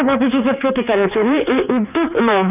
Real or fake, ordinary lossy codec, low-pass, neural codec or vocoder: fake; none; 3.6 kHz; codec, 16 kHz, 4 kbps, X-Codec, HuBERT features, trained on general audio